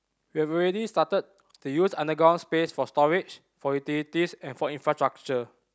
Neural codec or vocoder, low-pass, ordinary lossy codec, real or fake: none; none; none; real